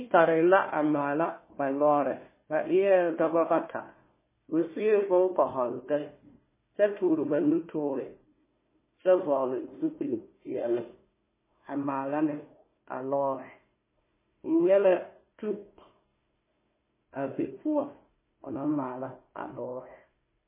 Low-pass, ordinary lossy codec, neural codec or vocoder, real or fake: 3.6 kHz; MP3, 16 kbps; codec, 16 kHz, 1 kbps, FunCodec, trained on Chinese and English, 50 frames a second; fake